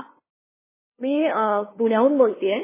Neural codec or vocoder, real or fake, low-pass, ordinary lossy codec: codec, 16 kHz, 2 kbps, FunCodec, trained on LibriTTS, 25 frames a second; fake; 3.6 kHz; MP3, 16 kbps